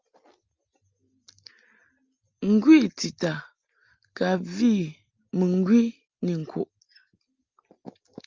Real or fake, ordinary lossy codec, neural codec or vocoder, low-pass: real; Opus, 32 kbps; none; 7.2 kHz